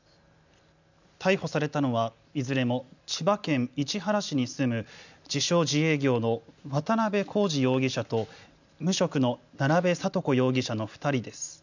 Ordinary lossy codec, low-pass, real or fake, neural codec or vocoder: none; 7.2 kHz; real; none